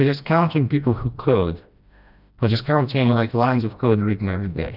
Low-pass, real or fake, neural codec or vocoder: 5.4 kHz; fake; codec, 16 kHz, 1 kbps, FreqCodec, smaller model